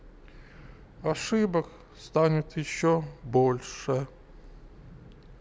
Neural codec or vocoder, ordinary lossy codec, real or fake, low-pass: none; none; real; none